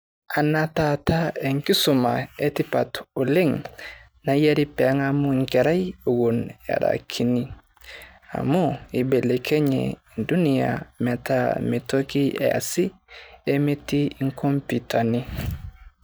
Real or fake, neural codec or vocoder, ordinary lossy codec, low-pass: real; none; none; none